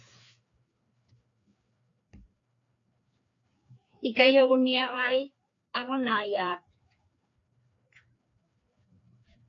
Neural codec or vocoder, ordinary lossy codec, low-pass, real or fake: codec, 16 kHz, 2 kbps, FreqCodec, larger model; AAC, 48 kbps; 7.2 kHz; fake